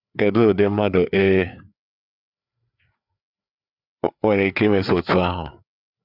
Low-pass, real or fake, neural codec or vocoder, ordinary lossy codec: 5.4 kHz; fake; codec, 16 kHz, 4 kbps, FreqCodec, larger model; none